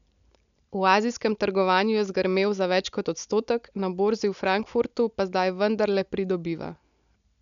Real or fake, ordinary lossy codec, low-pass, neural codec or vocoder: real; none; 7.2 kHz; none